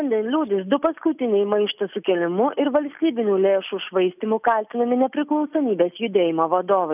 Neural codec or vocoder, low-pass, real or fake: none; 3.6 kHz; real